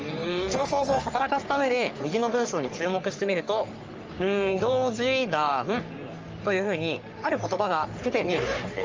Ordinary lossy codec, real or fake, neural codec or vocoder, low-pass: Opus, 24 kbps; fake; codec, 44.1 kHz, 3.4 kbps, Pupu-Codec; 7.2 kHz